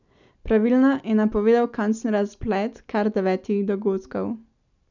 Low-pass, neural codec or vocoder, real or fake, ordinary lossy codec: 7.2 kHz; none; real; none